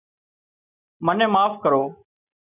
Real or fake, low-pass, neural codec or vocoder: real; 3.6 kHz; none